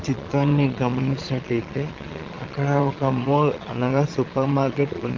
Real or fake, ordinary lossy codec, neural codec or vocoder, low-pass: fake; Opus, 24 kbps; vocoder, 22.05 kHz, 80 mel bands, Vocos; 7.2 kHz